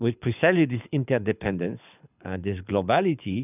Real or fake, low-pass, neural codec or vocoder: fake; 3.6 kHz; codec, 24 kHz, 6 kbps, HILCodec